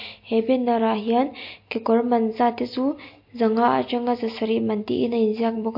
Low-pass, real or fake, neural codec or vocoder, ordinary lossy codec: 5.4 kHz; real; none; MP3, 32 kbps